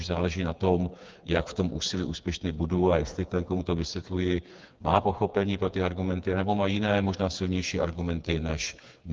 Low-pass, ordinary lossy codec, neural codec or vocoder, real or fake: 7.2 kHz; Opus, 24 kbps; codec, 16 kHz, 4 kbps, FreqCodec, smaller model; fake